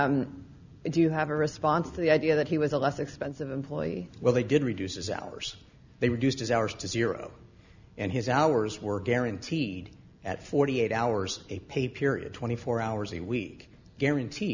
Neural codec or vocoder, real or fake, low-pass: none; real; 7.2 kHz